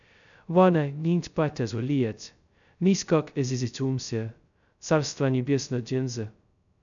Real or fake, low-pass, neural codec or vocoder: fake; 7.2 kHz; codec, 16 kHz, 0.2 kbps, FocalCodec